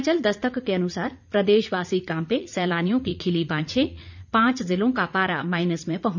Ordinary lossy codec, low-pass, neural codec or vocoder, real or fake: none; 7.2 kHz; vocoder, 44.1 kHz, 80 mel bands, Vocos; fake